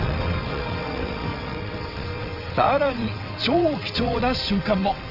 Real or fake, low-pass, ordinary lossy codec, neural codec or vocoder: fake; 5.4 kHz; none; vocoder, 22.05 kHz, 80 mel bands, WaveNeXt